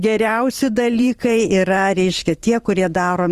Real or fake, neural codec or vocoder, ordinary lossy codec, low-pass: fake; vocoder, 44.1 kHz, 128 mel bands every 512 samples, BigVGAN v2; Opus, 24 kbps; 14.4 kHz